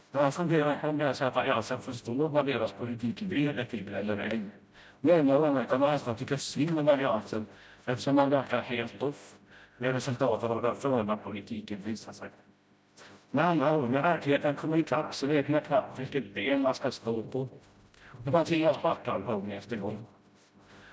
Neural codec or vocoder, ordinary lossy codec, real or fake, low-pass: codec, 16 kHz, 0.5 kbps, FreqCodec, smaller model; none; fake; none